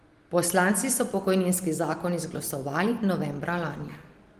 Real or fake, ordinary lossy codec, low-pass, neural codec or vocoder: real; Opus, 24 kbps; 14.4 kHz; none